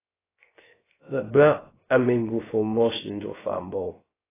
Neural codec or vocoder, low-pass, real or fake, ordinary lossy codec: codec, 16 kHz, 0.3 kbps, FocalCodec; 3.6 kHz; fake; AAC, 16 kbps